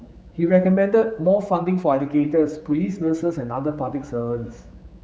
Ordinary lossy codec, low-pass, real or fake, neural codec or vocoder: none; none; fake; codec, 16 kHz, 4 kbps, X-Codec, HuBERT features, trained on general audio